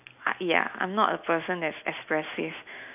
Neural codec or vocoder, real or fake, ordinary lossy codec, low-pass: none; real; AAC, 32 kbps; 3.6 kHz